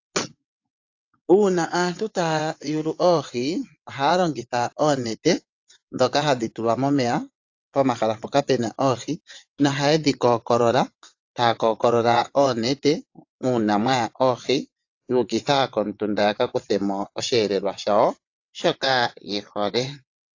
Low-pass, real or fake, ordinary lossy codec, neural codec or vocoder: 7.2 kHz; fake; AAC, 48 kbps; vocoder, 22.05 kHz, 80 mel bands, WaveNeXt